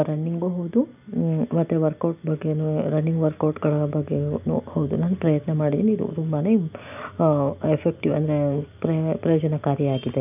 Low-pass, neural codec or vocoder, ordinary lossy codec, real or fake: 3.6 kHz; none; none; real